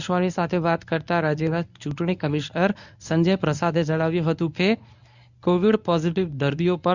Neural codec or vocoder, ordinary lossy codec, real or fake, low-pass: codec, 24 kHz, 0.9 kbps, WavTokenizer, medium speech release version 1; none; fake; 7.2 kHz